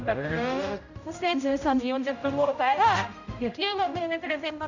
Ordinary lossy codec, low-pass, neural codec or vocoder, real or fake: none; 7.2 kHz; codec, 16 kHz, 0.5 kbps, X-Codec, HuBERT features, trained on general audio; fake